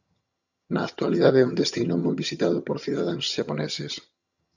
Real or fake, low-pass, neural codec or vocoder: fake; 7.2 kHz; vocoder, 22.05 kHz, 80 mel bands, HiFi-GAN